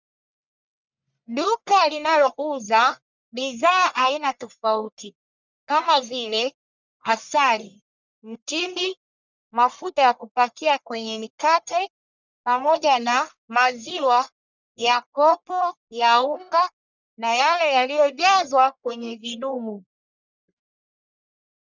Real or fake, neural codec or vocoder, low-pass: fake; codec, 44.1 kHz, 1.7 kbps, Pupu-Codec; 7.2 kHz